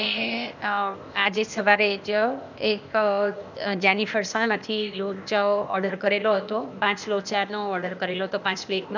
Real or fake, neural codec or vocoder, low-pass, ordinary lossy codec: fake; codec, 16 kHz, 0.8 kbps, ZipCodec; 7.2 kHz; none